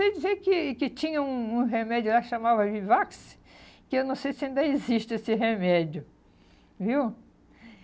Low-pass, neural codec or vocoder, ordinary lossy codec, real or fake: none; none; none; real